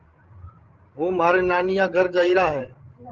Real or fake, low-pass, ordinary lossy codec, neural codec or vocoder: fake; 7.2 kHz; Opus, 16 kbps; codec, 16 kHz, 16 kbps, FreqCodec, larger model